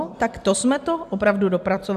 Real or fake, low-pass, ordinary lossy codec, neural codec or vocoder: real; 14.4 kHz; AAC, 96 kbps; none